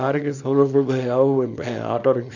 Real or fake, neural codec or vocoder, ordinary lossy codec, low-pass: fake; codec, 24 kHz, 0.9 kbps, WavTokenizer, small release; none; 7.2 kHz